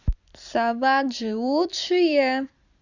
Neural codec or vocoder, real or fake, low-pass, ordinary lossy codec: vocoder, 44.1 kHz, 128 mel bands every 256 samples, BigVGAN v2; fake; 7.2 kHz; Opus, 64 kbps